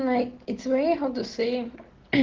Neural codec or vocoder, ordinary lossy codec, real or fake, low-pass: none; Opus, 16 kbps; real; 7.2 kHz